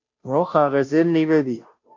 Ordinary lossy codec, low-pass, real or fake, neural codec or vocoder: MP3, 48 kbps; 7.2 kHz; fake; codec, 16 kHz, 0.5 kbps, FunCodec, trained on Chinese and English, 25 frames a second